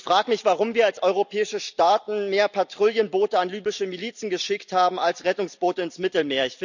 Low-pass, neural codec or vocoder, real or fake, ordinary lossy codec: 7.2 kHz; none; real; none